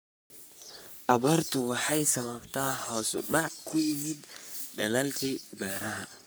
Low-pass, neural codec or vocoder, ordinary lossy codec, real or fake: none; codec, 44.1 kHz, 3.4 kbps, Pupu-Codec; none; fake